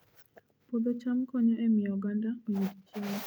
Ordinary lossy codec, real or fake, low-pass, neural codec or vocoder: none; real; none; none